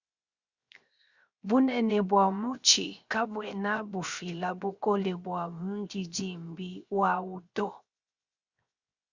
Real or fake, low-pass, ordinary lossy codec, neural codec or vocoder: fake; 7.2 kHz; Opus, 64 kbps; codec, 16 kHz, 0.7 kbps, FocalCodec